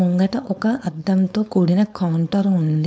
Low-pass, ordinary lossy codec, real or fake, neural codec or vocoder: none; none; fake; codec, 16 kHz, 4.8 kbps, FACodec